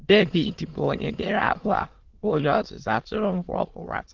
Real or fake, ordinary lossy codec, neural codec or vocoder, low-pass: fake; Opus, 16 kbps; autoencoder, 22.05 kHz, a latent of 192 numbers a frame, VITS, trained on many speakers; 7.2 kHz